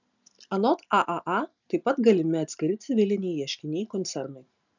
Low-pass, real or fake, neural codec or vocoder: 7.2 kHz; real; none